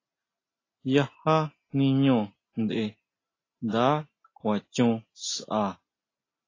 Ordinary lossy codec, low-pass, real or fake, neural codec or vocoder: AAC, 32 kbps; 7.2 kHz; real; none